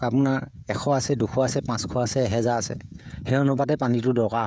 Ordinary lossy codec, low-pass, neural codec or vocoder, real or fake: none; none; codec, 16 kHz, 16 kbps, FreqCodec, smaller model; fake